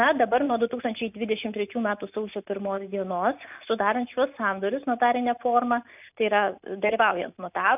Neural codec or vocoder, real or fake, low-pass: none; real; 3.6 kHz